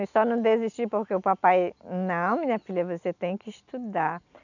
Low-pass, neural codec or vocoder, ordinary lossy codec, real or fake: 7.2 kHz; none; none; real